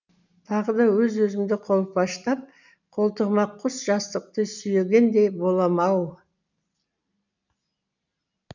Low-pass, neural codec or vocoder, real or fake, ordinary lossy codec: 7.2 kHz; none; real; none